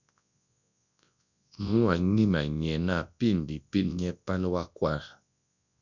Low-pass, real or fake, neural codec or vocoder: 7.2 kHz; fake; codec, 24 kHz, 0.9 kbps, WavTokenizer, large speech release